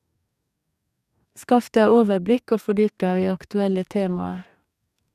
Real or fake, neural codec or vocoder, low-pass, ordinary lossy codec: fake; codec, 44.1 kHz, 2.6 kbps, DAC; 14.4 kHz; none